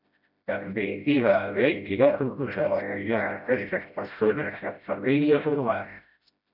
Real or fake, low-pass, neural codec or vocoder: fake; 5.4 kHz; codec, 16 kHz, 0.5 kbps, FreqCodec, smaller model